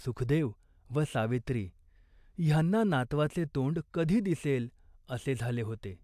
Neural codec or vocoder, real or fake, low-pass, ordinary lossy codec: none; real; 14.4 kHz; AAC, 96 kbps